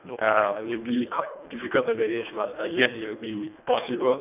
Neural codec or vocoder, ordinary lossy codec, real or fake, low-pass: codec, 24 kHz, 1.5 kbps, HILCodec; none; fake; 3.6 kHz